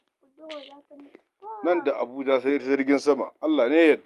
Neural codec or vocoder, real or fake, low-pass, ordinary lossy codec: none; real; 14.4 kHz; Opus, 32 kbps